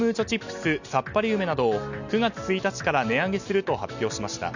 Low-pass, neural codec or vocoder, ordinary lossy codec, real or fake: 7.2 kHz; none; none; real